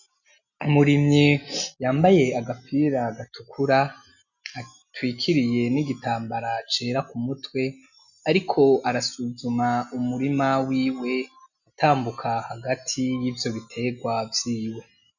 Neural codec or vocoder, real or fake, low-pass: none; real; 7.2 kHz